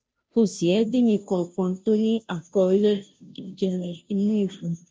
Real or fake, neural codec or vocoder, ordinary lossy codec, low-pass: fake; codec, 16 kHz, 0.5 kbps, FunCodec, trained on Chinese and English, 25 frames a second; none; none